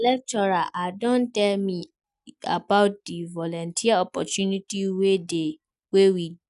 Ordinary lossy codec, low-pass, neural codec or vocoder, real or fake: none; 10.8 kHz; none; real